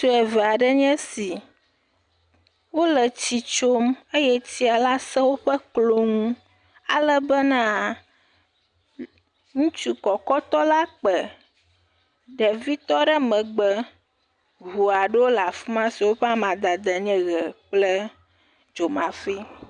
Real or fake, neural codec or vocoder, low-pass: real; none; 9.9 kHz